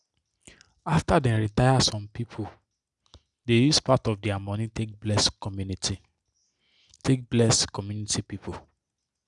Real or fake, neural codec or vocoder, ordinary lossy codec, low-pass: real; none; none; 10.8 kHz